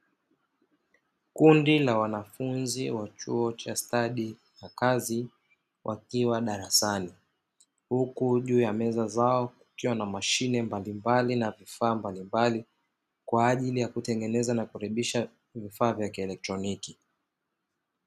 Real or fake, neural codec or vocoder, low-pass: real; none; 14.4 kHz